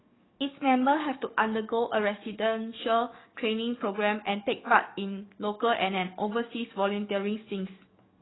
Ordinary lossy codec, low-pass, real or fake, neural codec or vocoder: AAC, 16 kbps; 7.2 kHz; fake; codec, 44.1 kHz, 7.8 kbps, DAC